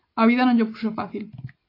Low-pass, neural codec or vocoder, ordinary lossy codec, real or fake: 5.4 kHz; none; AAC, 24 kbps; real